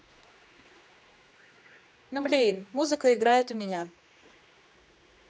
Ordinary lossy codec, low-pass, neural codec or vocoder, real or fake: none; none; codec, 16 kHz, 2 kbps, X-Codec, HuBERT features, trained on general audio; fake